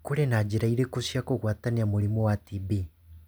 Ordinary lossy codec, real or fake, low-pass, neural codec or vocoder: none; real; none; none